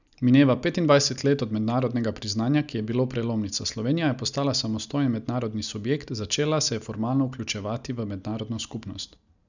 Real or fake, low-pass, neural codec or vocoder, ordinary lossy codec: real; 7.2 kHz; none; none